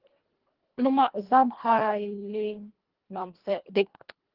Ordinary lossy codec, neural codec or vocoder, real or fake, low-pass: Opus, 16 kbps; codec, 24 kHz, 1.5 kbps, HILCodec; fake; 5.4 kHz